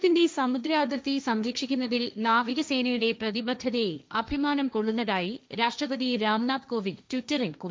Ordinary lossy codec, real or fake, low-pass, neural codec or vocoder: none; fake; none; codec, 16 kHz, 1.1 kbps, Voila-Tokenizer